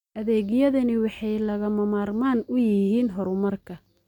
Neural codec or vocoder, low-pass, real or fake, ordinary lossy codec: none; 19.8 kHz; real; none